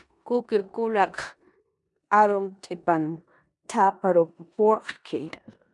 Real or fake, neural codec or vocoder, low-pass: fake; codec, 16 kHz in and 24 kHz out, 0.9 kbps, LongCat-Audio-Codec, four codebook decoder; 10.8 kHz